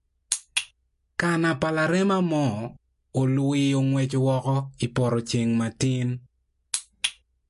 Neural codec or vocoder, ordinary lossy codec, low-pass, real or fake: none; MP3, 48 kbps; 14.4 kHz; real